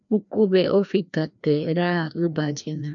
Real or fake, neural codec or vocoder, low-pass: fake; codec, 16 kHz, 1 kbps, FreqCodec, larger model; 7.2 kHz